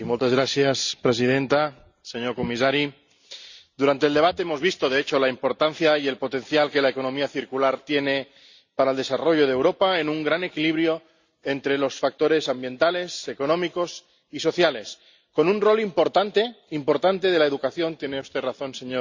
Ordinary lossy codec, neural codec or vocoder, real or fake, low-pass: Opus, 64 kbps; none; real; 7.2 kHz